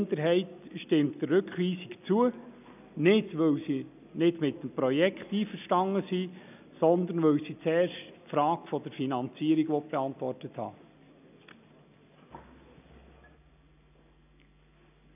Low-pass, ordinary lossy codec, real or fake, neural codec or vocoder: 3.6 kHz; none; real; none